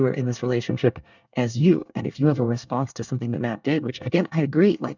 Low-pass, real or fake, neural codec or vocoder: 7.2 kHz; fake; codec, 24 kHz, 1 kbps, SNAC